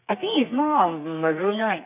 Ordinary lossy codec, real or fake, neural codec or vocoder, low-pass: none; fake; codec, 32 kHz, 1.9 kbps, SNAC; 3.6 kHz